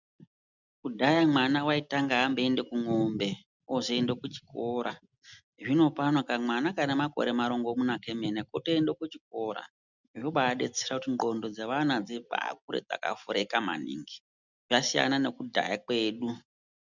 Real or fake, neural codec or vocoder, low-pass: real; none; 7.2 kHz